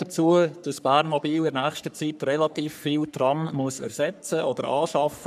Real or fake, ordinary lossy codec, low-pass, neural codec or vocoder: fake; none; 14.4 kHz; codec, 44.1 kHz, 3.4 kbps, Pupu-Codec